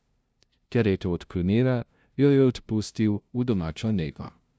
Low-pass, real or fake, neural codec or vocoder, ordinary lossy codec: none; fake; codec, 16 kHz, 0.5 kbps, FunCodec, trained on LibriTTS, 25 frames a second; none